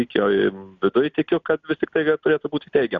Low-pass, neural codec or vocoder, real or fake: 7.2 kHz; none; real